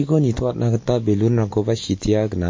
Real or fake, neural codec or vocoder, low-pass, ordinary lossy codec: real; none; 7.2 kHz; MP3, 32 kbps